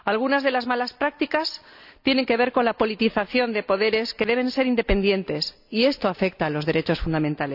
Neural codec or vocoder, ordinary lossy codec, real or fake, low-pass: none; none; real; 5.4 kHz